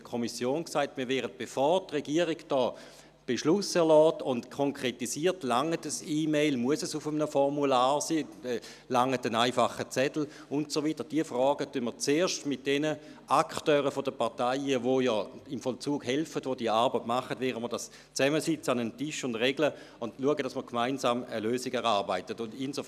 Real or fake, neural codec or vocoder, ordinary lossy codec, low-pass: real; none; none; 14.4 kHz